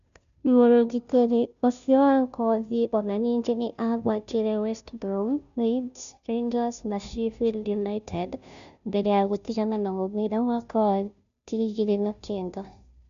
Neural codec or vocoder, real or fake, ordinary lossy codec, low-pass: codec, 16 kHz, 0.5 kbps, FunCodec, trained on Chinese and English, 25 frames a second; fake; none; 7.2 kHz